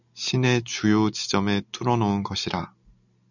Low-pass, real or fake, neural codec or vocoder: 7.2 kHz; real; none